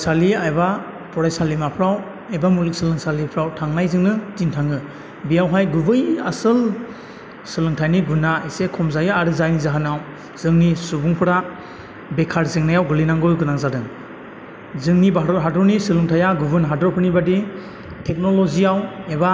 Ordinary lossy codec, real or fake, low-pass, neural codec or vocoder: none; real; none; none